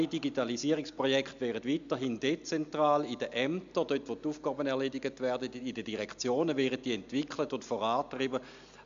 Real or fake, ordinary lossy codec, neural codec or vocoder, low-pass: real; MP3, 64 kbps; none; 7.2 kHz